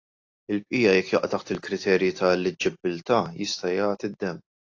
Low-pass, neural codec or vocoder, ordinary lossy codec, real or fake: 7.2 kHz; none; AAC, 48 kbps; real